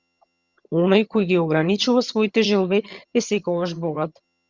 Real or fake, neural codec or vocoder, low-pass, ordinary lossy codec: fake; vocoder, 22.05 kHz, 80 mel bands, HiFi-GAN; 7.2 kHz; Opus, 64 kbps